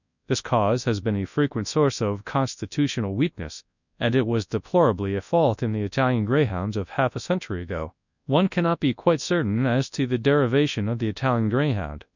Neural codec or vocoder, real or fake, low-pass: codec, 24 kHz, 0.9 kbps, WavTokenizer, large speech release; fake; 7.2 kHz